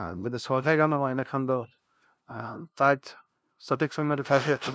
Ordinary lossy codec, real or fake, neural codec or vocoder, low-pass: none; fake; codec, 16 kHz, 0.5 kbps, FunCodec, trained on LibriTTS, 25 frames a second; none